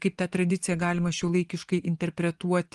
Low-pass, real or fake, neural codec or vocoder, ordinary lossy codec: 10.8 kHz; fake; vocoder, 24 kHz, 100 mel bands, Vocos; Opus, 24 kbps